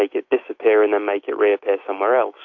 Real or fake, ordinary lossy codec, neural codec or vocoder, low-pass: fake; AAC, 48 kbps; autoencoder, 48 kHz, 128 numbers a frame, DAC-VAE, trained on Japanese speech; 7.2 kHz